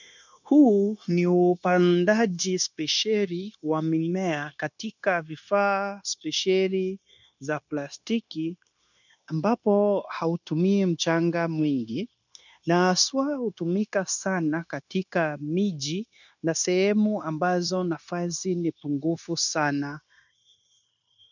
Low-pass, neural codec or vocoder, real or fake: 7.2 kHz; codec, 16 kHz, 0.9 kbps, LongCat-Audio-Codec; fake